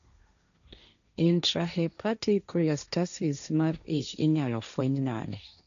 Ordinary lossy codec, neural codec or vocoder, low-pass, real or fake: none; codec, 16 kHz, 1.1 kbps, Voila-Tokenizer; 7.2 kHz; fake